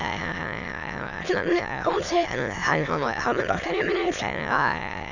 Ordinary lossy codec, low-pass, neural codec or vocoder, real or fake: none; 7.2 kHz; autoencoder, 22.05 kHz, a latent of 192 numbers a frame, VITS, trained on many speakers; fake